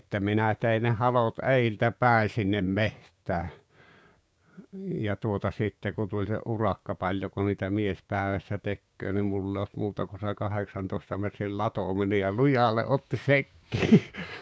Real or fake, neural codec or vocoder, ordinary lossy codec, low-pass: fake; codec, 16 kHz, 6 kbps, DAC; none; none